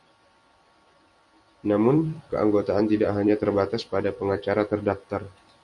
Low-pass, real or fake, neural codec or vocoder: 10.8 kHz; fake; vocoder, 44.1 kHz, 128 mel bands every 256 samples, BigVGAN v2